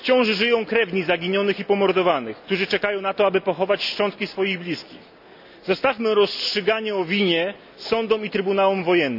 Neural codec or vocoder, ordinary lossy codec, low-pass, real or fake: none; none; 5.4 kHz; real